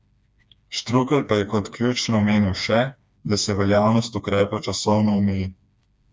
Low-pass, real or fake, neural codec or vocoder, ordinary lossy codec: none; fake; codec, 16 kHz, 2 kbps, FreqCodec, smaller model; none